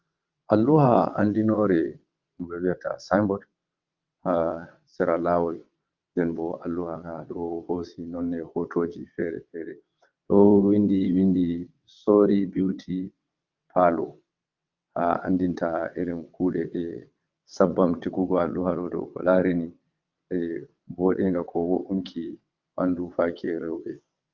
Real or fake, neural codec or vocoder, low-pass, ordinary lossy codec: fake; vocoder, 22.05 kHz, 80 mel bands, WaveNeXt; 7.2 kHz; Opus, 24 kbps